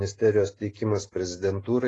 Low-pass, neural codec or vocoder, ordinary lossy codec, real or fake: 10.8 kHz; none; AAC, 32 kbps; real